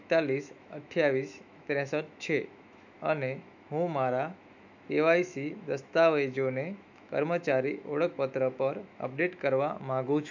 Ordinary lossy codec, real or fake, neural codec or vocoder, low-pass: none; fake; autoencoder, 48 kHz, 128 numbers a frame, DAC-VAE, trained on Japanese speech; 7.2 kHz